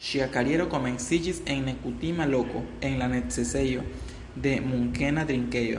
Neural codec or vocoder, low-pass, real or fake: none; 10.8 kHz; real